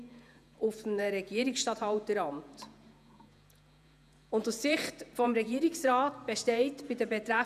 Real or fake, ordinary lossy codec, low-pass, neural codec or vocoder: fake; none; 14.4 kHz; vocoder, 48 kHz, 128 mel bands, Vocos